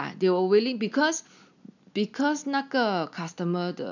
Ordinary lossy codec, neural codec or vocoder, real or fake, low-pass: none; none; real; 7.2 kHz